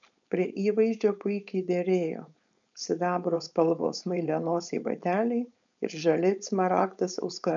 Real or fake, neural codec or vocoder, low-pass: fake; codec, 16 kHz, 4.8 kbps, FACodec; 7.2 kHz